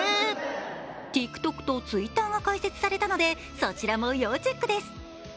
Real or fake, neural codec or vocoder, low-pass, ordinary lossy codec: real; none; none; none